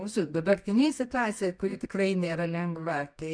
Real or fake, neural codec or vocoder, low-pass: fake; codec, 24 kHz, 0.9 kbps, WavTokenizer, medium music audio release; 9.9 kHz